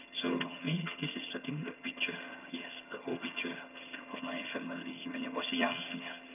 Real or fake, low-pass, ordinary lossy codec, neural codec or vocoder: fake; 3.6 kHz; none; vocoder, 22.05 kHz, 80 mel bands, HiFi-GAN